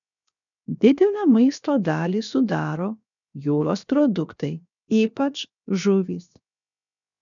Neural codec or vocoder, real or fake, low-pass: codec, 16 kHz, 0.7 kbps, FocalCodec; fake; 7.2 kHz